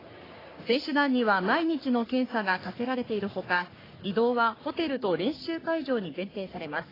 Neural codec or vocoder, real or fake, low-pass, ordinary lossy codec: codec, 44.1 kHz, 3.4 kbps, Pupu-Codec; fake; 5.4 kHz; AAC, 24 kbps